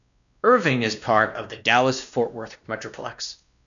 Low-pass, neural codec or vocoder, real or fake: 7.2 kHz; codec, 16 kHz, 1 kbps, X-Codec, WavLM features, trained on Multilingual LibriSpeech; fake